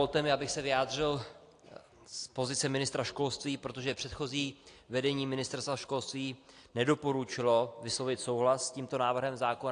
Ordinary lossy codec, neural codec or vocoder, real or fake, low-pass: AAC, 48 kbps; none; real; 9.9 kHz